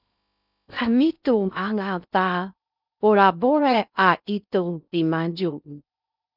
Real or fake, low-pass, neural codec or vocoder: fake; 5.4 kHz; codec, 16 kHz in and 24 kHz out, 0.6 kbps, FocalCodec, streaming, 2048 codes